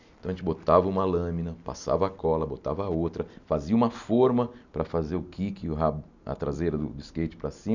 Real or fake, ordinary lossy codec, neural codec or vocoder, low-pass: real; none; none; 7.2 kHz